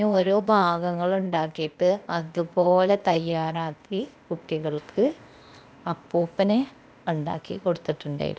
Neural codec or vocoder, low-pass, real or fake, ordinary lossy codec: codec, 16 kHz, 0.8 kbps, ZipCodec; none; fake; none